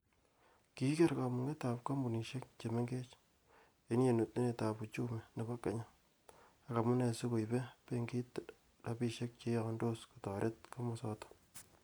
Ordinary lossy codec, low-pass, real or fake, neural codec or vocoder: none; none; real; none